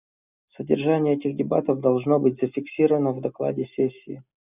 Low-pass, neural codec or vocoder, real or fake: 3.6 kHz; none; real